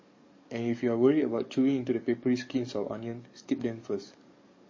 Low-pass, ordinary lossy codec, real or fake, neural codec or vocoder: 7.2 kHz; MP3, 32 kbps; fake; codec, 44.1 kHz, 7.8 kbps, DAC